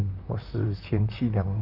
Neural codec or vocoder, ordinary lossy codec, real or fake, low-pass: vocoder, 44.1 kHz, 128 mel bands, Pupu-Vocoder; none; fake; 5.4 kHz